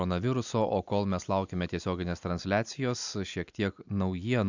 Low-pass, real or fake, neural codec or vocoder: 7.2 kHz; real; none